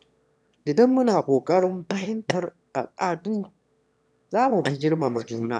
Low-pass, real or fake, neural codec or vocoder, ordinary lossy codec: none; fake; autoencoder, 22.05 kHz, a latent of 192 numbers a frame, VITS, trained on one speaker; none